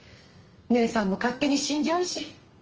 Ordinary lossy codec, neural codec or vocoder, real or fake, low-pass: Opus, 24 kbps; codec, 32 kHz, 1.9 kbps, SNAC; fake; 7.2 kHz